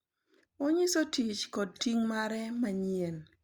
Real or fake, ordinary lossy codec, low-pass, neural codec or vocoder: real; Opus, 64 kbps; 19.8 kHz; none